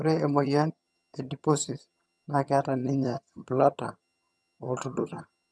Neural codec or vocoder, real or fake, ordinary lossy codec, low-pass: vocoder, 22.05 kHz, 80 mel bands, HiFi-GAN; fake; none; none